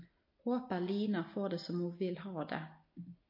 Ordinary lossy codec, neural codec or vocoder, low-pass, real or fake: MP3, 32 kbps; none; 5.4 kHz; real